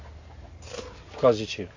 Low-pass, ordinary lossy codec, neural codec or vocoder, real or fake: 7.2 kHz; AAC, 48 kbps; none; real